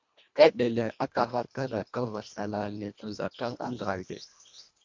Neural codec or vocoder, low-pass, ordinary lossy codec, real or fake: codec, 24 kHz, 1.5 kbps, HILCodec; 7.2 kHz; MP3, 64 kbps; fake